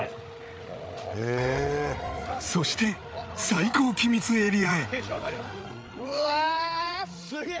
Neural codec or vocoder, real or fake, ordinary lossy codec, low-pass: codec, 16 kHz, 8 kbps, FreqCodec, larger model; fake; none; none